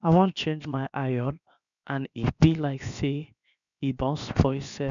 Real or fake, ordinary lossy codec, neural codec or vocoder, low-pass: fake; none; codec, 16 kHz, 0.7 kbps, FocalCodec; 7.2 kHz